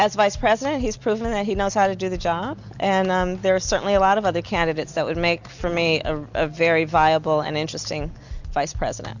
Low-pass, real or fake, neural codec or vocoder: 7.2 kHz; real; none